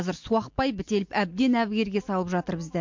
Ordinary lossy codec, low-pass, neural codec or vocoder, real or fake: MP3, 48 kbps; 7.2 kHz; none; real